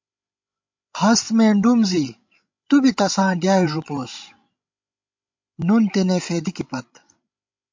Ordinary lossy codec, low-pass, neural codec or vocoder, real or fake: MP3, 48 kbps; 7.2 kHz; codec, 16 kHz, 16 kbps, FreqCodec, larger model; fake